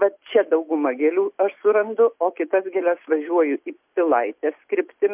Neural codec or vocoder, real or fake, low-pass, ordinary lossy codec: none; real; 3.6 kHz; MP3, 32 kbps